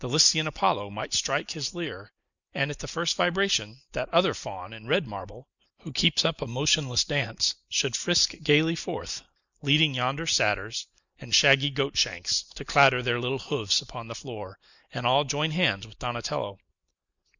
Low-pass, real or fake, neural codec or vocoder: 7.2 kHz; real; none